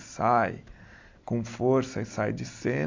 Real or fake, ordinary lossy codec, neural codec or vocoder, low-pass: real; MP3, 64 kbps; none; 7.2 kHz